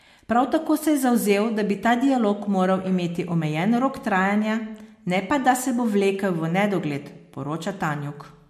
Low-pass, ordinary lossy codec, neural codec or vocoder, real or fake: 14.4 kHz; MP3, 64 kbps; none; real